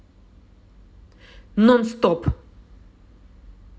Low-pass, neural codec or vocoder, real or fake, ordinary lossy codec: none; none; real; none